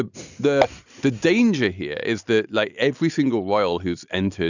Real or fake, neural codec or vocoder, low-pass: real; none; 7.2 kHz